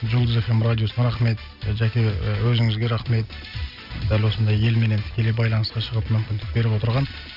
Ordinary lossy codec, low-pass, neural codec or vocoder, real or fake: none; 5.4 kHz; none; real